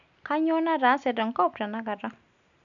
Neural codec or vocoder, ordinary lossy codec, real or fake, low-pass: none; none; real; 7.2 kHz